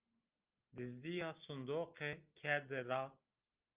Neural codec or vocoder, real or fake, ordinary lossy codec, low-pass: none; real; Opus, 24 kbps; 3.6 kHz